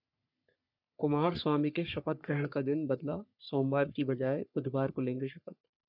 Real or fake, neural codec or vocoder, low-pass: fake; codec, 44.1 kHz, 3.4 kbps, Pupu-Codec; 5.4 kHz